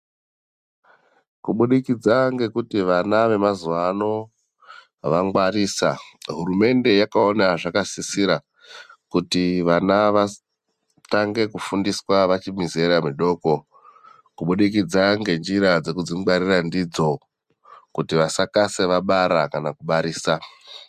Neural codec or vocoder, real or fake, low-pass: none; real; 14.4 kHz